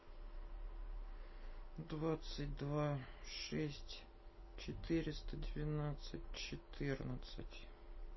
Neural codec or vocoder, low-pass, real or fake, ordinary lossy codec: none; 7.2 kHz; real; MP3, 24 kbps